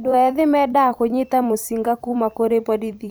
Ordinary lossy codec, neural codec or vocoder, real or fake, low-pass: none; vocoder, 44.1 kHz, 128 mel bands every 256 samples, BigVGAN v2; fake; none